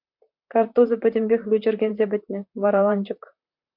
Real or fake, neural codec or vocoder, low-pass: fake; vocoder, 44.1 kHz, 128 mel bands, Pupu-Vocoder; 5.4 kHz